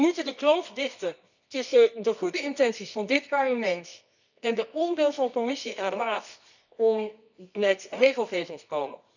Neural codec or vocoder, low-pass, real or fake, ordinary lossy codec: codec, 24 kHz, 0.9 kbps, WavTokenizer, medium music audio release; 7.2 kHz; fake; none